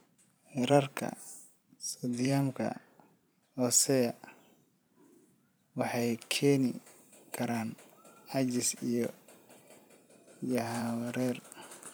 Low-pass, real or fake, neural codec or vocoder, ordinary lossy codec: none; fake; vocoder, 44.1 kHz, 128 mel bands every 512 samples, BigVGAN v2; none